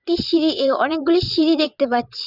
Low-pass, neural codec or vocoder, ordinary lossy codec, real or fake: 5.4 kHz; vocoder, 22.05 kHz, 80 mel bands, WaveNeXt; none; fake